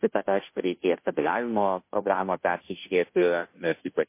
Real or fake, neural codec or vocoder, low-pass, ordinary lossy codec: fake; codec, 16 kHz, 0.5 kbps, FunCodec, trained on Chinese and English, 25 frames a second; 3.6 kHz; MP3, 24 kbps